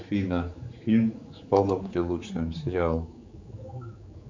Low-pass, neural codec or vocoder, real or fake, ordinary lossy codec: 7.2 kHz; codec, 16 kHz, 4 kbps, X-Codec, HuBERT features, trained on general audio; fake; MP3, 64 kbps